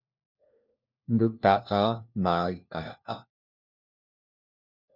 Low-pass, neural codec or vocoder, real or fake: 5.4 kHz; codec, 16 kHz, 1 kbps, FunCodec, trained on LibriTTS, 50 frames a second; fake